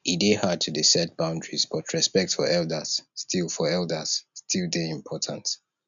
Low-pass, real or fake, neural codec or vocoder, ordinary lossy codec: 7.2 kHz; real; none; none